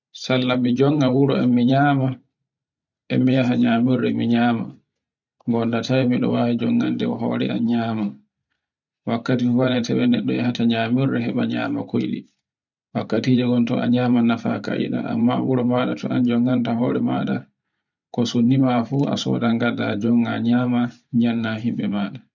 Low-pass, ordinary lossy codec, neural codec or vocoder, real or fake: 7.2 kHz; none; vocoder, 44.1 kHz, 128 mel bands every 512 samples, BigVGAN v2; fake